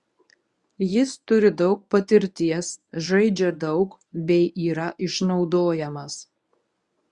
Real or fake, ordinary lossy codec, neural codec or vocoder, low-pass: fake; Opus, 64 kbps; codec, 24 kHz, 0.9 kbps, WavTokenizer, medium speech release version 1; 10.8 kHz